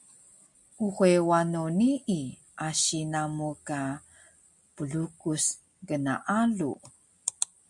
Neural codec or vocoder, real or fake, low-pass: none; real; 10.8 kHz